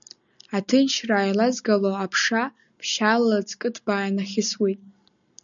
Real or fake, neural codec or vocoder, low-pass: real; none; 7.2 kHz